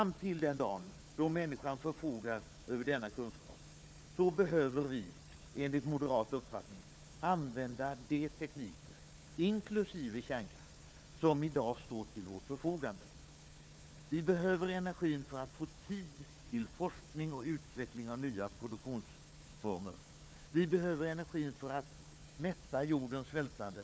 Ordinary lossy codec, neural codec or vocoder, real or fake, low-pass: none; codec, 16 kHz, 4 kbps, FunCodec, trained on Chinese and English, 50 frames a second; fake; none